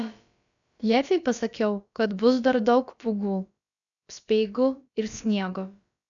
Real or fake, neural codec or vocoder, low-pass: fake; codec, 16 kHz, about 1 kbps, DyCAST, with the encoder's durations; 7.2 kHz